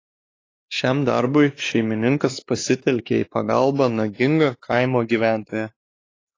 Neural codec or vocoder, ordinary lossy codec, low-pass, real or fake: codec, 16 kHz, 2 kbps, X-Codec, WavLM features, trained on Multilingual LibriSpeech; AAC, 32 kbps; 7.2 kHz; fake